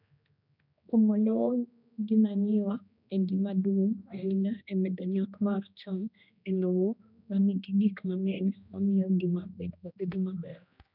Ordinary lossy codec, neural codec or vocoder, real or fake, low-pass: none; codec, 16 kHz, 1 kbps, X-Codec, HuBERT features, trained on general audio; fake; 5.4 kHz